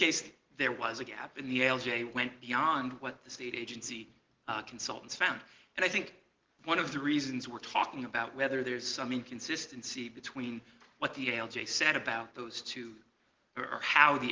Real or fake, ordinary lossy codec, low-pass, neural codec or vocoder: real; Opus, 16 kbps; 7.2 kHz; none